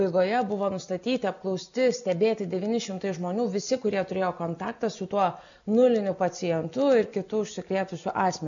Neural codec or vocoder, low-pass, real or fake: none; 7.2 kHz; real